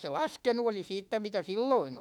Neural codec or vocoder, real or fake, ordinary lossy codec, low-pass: autoencoder, 48 kHz, 32 numbers a frame, DAC-VAE, trained on Japanese speech; fake; none; 19.8 kHz